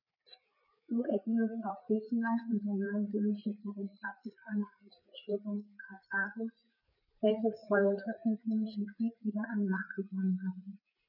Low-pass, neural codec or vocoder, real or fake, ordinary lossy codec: 5.4 kHz; codec, 16 kHz, 4 kbps, FreqCodec, larger model; fake; none